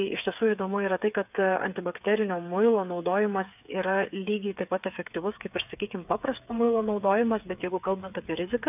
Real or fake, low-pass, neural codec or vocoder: fake; 3.6 kHz; codec, 16 kHz, 8 kbps, FreqCodec, smaller model